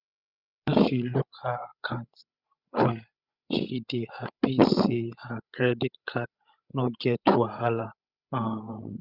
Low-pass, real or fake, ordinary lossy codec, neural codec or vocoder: 5.4 kHz; fake; Opus, 64 kbps; codec, 16 kHz, 16 kbps, FreqCodec, larger model